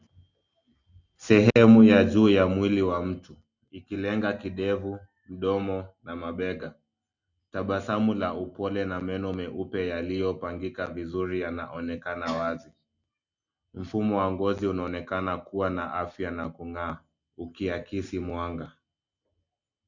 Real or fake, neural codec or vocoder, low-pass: real; none; 7.2 kHz